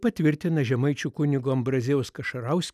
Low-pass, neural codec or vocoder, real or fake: 14.4 kHz; none; real